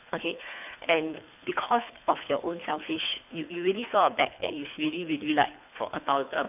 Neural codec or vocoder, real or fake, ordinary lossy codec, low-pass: codec, 24 kHz, 3 kbps, HILCodec; fake; none; 3.6 kHz